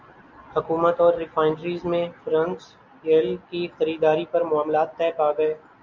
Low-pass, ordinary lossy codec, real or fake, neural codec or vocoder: 7.2 kHz; MP3, 64 kbps; real; none